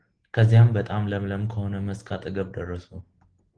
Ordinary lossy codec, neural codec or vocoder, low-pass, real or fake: Opus, 16 kbps; none; 9.9 kHz; real